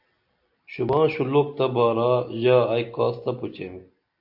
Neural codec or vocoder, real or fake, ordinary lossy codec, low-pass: none; real; AAC, 48 kbps; 5.4 kHz